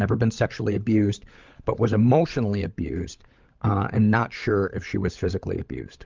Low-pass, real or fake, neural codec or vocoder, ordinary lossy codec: 7.2 kHz; fake; codec, 16 kHz, 16 kbps, FunCodec, trained on LibriTTS, 50 frames a second; Opus, 24 kbps